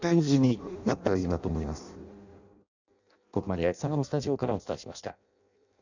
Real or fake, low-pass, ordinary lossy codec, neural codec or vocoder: fake; 7.2 kHz; none; codec, 16 kHz in and 24 kHz out, 0.6 kbps, FireRedTTS-2 codec